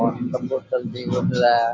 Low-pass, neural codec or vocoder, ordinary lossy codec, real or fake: none; none; none; real